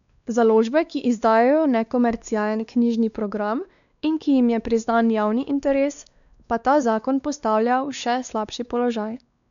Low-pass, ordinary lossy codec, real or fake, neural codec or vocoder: 7.2 kHz; none; fake; codec, 16 kHz, 2 kbps, X-Codec, WavLM features, trained on Multilingual LibriSpeech